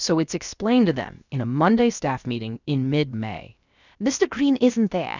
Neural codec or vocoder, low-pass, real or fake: codec, 16 kHz, about 1 kbps, DyCAST, with the encoder's durations; 7.2 kHz; fake